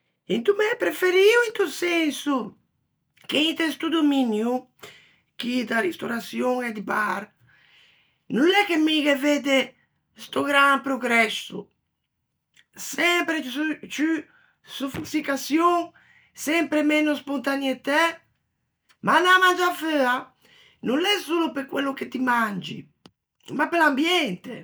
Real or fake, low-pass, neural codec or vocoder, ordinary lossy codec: real; none; none; none